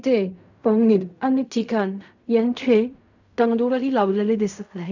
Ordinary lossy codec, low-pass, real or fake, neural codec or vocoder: none; 7.2 kHz; fake; codec, 16 kHz in and 24 kHz out, 0.4 kbps, LongCat-Audio-Codec, fine tuned four codebook decoder